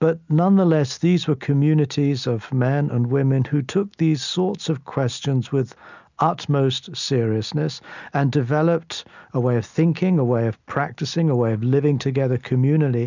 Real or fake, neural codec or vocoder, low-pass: real; none; 7.2 kHz